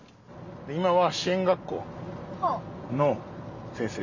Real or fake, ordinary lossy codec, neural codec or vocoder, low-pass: real; none; none; 7.2 kHz